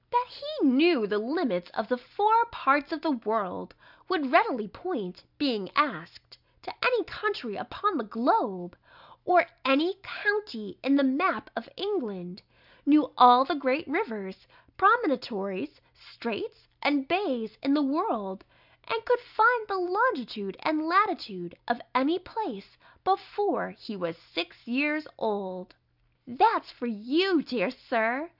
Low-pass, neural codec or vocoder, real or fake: 5.4 kHz; none; real